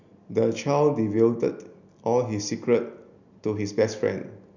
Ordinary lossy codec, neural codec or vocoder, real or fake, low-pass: none; none; real; 7.2 kHz